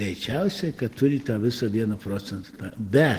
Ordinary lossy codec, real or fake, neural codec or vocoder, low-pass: Opus, 16 kbps; real; none; 14.4 kHz